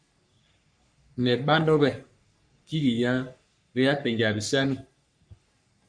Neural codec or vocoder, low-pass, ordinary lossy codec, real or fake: codec, 44.1 kHz, 3.4 kbps, Pupu-Codec; 9.9 kHz; MP3, 96 kbps; fake